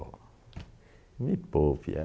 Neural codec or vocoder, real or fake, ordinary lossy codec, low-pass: none; real; none; none